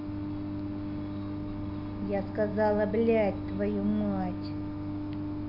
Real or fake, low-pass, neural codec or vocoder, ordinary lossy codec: real; 5.4 kHz; none; MP3, 48 kbps